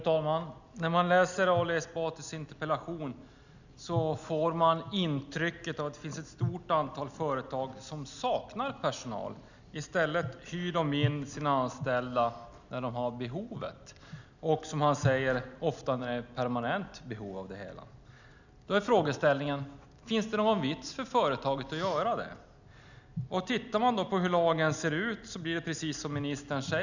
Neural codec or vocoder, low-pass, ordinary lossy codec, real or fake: none; 7.2 kHz; none; real